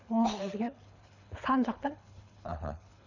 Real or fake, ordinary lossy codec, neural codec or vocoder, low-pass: fake; none; codec, 24 kHz, 6 kbps, HILCodec; 7.2 kHz